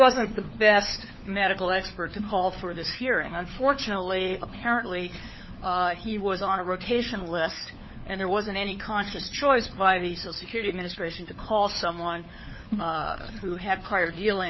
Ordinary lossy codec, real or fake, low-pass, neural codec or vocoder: MP3, 24 kbps; fake; 7.2 kHz; codec, 16 kHz, 4 kbps, FunCodec, trained on LibriTTS, 50 frames a second